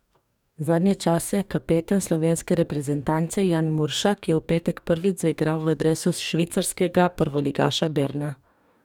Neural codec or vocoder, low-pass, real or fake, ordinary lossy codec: codec, 44.1 kHz, 2.6 kbps, DAC; 19.8 kHz; fake; none